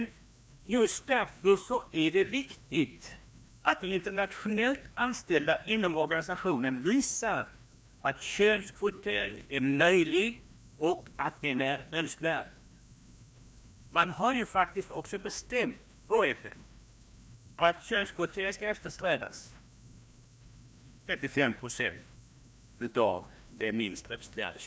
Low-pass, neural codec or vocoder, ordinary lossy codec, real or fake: none; codec, 16 kHz, 1 kbps, FreqCodec, larger model; none; fake